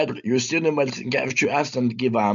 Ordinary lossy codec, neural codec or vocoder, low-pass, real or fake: MP3, 96 kbps; none; 7.2 kHz; real